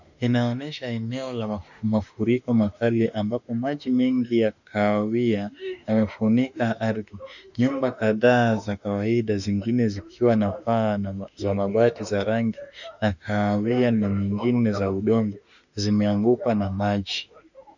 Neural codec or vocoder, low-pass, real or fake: autoencoder, 48 kHz, 32 numbers a frame, DAC-VAE, trained on Japanese speech; 7.2 kHz; fake